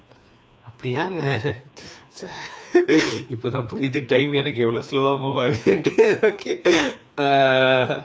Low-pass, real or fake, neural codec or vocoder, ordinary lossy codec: none; fake; codec, 16 kHz, 2 kbps, FreqCodec, larger model; none